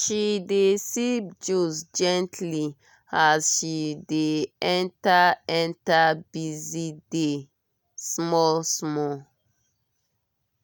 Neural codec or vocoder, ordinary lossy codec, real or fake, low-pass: none; none; real; none